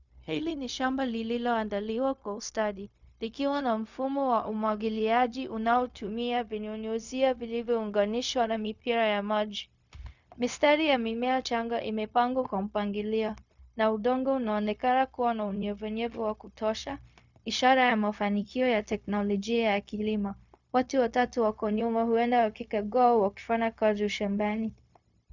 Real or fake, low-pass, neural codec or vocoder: fake; 7.2 kHz; codec, 16 kHz, 0.4 kbps, LongCat-Audio-Codec